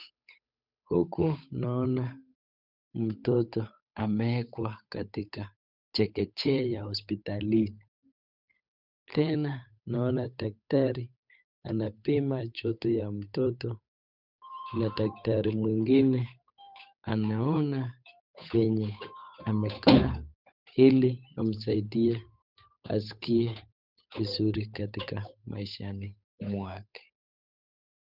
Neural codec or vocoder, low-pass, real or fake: codec, 16 kHz, 8 kbps, FunCodec, trained on Chinese and English, 25 frames a second; 5.4 kHz; fake